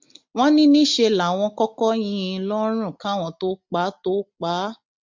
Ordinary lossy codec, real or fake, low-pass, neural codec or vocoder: MP3, 48 kbps; real; 7.2 kHz; none